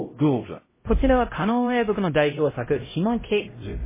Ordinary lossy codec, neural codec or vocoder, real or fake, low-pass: MP3, 16 kbps; codec, 16 kHz, 0.5 kbps, X-Codec, HuBERT features, trained on LibriSpeech; fake; 3.6 kHz